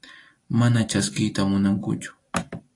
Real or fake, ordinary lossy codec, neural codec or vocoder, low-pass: fake; AAC, 48 kbps; vocoder, 44.1 kHz, 128 mel bands every 256 samples, BigVGAN v2; 10.8 kHz